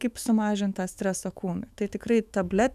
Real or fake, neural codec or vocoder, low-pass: fake; autoencoder, 48 kHz, 128 numbers a frame, DAC-VAE, trained on Japanese speech; 14.4 kHz